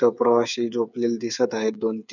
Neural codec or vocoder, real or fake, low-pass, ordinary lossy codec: codec, 16 kHz, 8 kbps, FreqCodec, smaller model; fake; 7.2 kHz; none